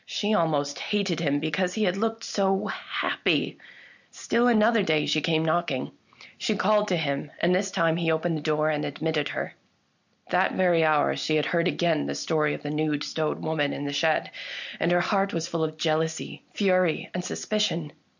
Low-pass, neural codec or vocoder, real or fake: 7.2 kHz; none; real